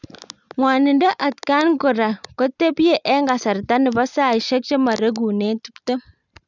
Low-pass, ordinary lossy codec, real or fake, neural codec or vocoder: 7.2 kHz; none; real; none